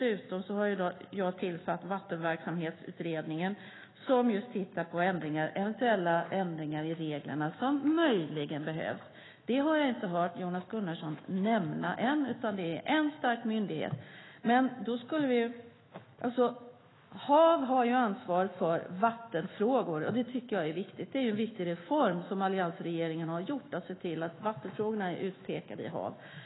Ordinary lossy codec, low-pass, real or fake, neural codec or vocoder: AAC, 16 kbps; 7.2 kHz; fake; autoencoder, 48 kHz, 128 numbers a frame, DAC-VAE, trained on Japanese speech